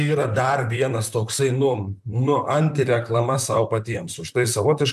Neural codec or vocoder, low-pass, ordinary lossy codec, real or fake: vocoder, 44.1 kHz, 128 mel bands, Pupu-Vocoder; 14.4 kHz; MP3, 96 kbps; fake